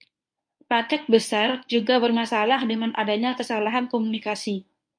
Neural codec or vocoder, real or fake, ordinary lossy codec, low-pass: codec, 24 kHz, 0.9 kbps, WavTokenizer, medium speech release version 1; fake; MP3, 48 kbps; 9.9 kHz